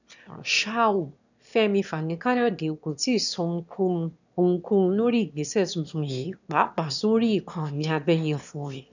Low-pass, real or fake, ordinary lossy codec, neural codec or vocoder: 7.2 kHz; fake; none; autoencoder, 22.05 kHz, a latent of 192 numbers a frame, VITS, trained on one speaker